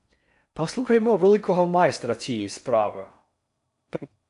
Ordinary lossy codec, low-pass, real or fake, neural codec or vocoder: MP3, 96 kbps; 10.8 kHz; fake; codec, 16 kHz in and 24 kHz out, 0.6 kbps, FocalCodec, streaming, 4096 codes